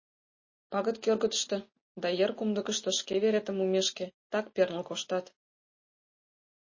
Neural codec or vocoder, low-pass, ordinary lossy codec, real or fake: none; 7.2 kHz; MP3, 32 kbps; real